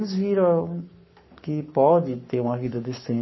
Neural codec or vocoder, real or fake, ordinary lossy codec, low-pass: codec, 44.1 kHz, 7.8 kbps, Pupu-Codec; fake; MP3, 24 kbps; 7.2 kHz